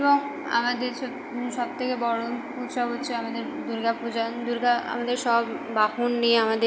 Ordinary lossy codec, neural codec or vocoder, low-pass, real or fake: none; none; none; real